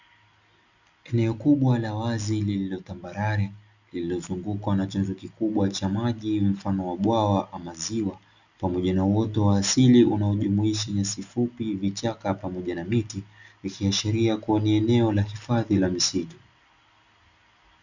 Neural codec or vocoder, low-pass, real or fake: none; 7.2 kHz; real